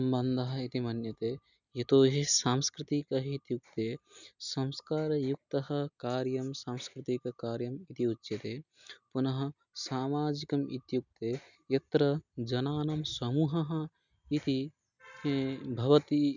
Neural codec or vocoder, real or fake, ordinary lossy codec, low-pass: none; real; none; 7.2 kHz